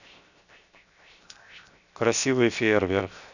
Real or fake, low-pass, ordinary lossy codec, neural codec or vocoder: fake; 7.2 kHz; none; codec, 16 kHz, 0.7 kbps, FocalCodec